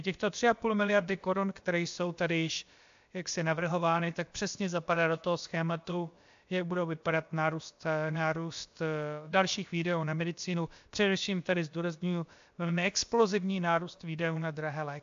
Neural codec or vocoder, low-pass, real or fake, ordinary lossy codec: codec, 16 kHz, about 1 kbps, DyCAST, with the encoder's durations; 7.2 kHz; fake; AAC, 64 kbps